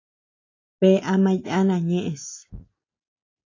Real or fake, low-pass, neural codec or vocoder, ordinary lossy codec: real; 7.2 kHz; none; AAC, 32 kbps